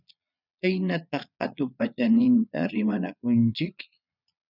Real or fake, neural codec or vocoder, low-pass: fake; vocoder, 22.05 kHz, 80 mel bands, Vocos; 5.4 kHz